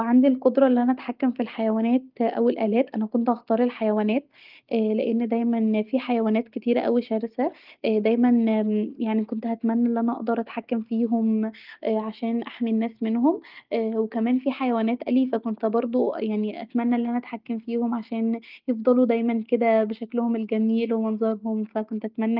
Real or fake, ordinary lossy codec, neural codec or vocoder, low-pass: real; Opus, 32 kbps; none; 5.4 kHz